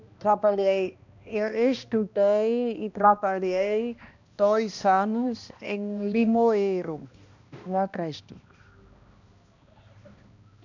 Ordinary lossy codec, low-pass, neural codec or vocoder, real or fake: none; 7.2 kHz; codec, 16 kHz, 1 kbps, X-Codec, HuBERT features, trained on balanced general audio; fake